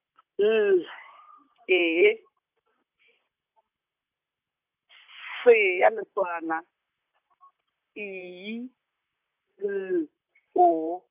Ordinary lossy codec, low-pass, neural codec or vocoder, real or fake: AAC, 32 kbps; 3.6 kHz; vocoder, 44.1 kHz, 128 mel bands, Pupu-Vocoder; fake